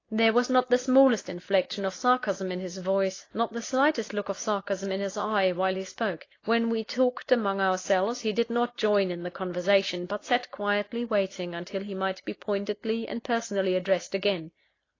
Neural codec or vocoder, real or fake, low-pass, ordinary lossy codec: none; real; 7.2 kHz; AAC, 32 kbps